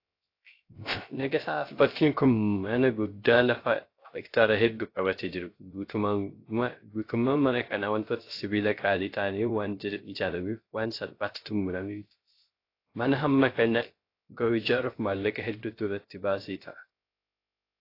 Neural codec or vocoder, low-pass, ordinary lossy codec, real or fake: codec, 16 kHz, 0.3 kbps, FocalCodec; 5.4 kHz; AAC, 32 kbps; fake